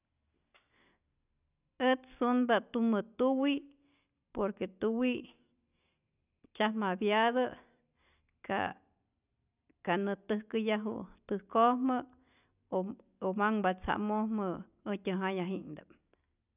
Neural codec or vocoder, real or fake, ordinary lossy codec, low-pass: none; real; none; 3.6 kHz